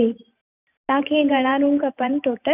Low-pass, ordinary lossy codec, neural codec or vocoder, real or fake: 3.6 kHz; none; vocoder, 44.1 kHz, 128 mel bands every 512 samples, BigVGAN v2; fake